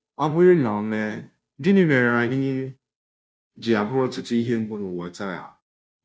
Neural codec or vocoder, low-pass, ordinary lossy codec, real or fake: codec, 16 kHz, 0.5 kbps, FunCodec, trained on Chinese and English, 25 frames a second; none; none; fake